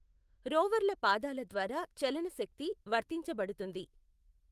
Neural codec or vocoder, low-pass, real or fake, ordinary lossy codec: autoencoder, 48 kHz, 128 numbers a frame, DAC-VAE, trained on Japanese speech; 14.4 kHz; fake; Opus, 24 kbps